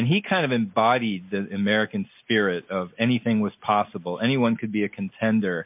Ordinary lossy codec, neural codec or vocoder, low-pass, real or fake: MP3, 32 kbps; none; 3.6 kHz; real